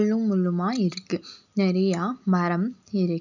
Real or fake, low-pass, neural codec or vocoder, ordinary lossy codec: real; 7.2 kHz; none; none